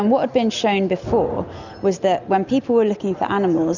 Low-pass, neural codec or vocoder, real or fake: 7.2 kHz; none; real